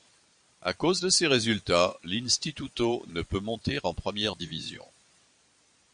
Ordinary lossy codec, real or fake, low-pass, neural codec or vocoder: Opus, 64 kbps; fake; 9.9 kHz; vocoder, 22.05 kHz, 80 mel bands, Vocos